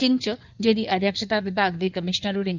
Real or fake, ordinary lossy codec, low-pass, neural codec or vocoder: fake; none; 7.2 kHz; codec, 16 kHz in and 24 kHz out, 1.1 kbps, FireRedTTS-2 codec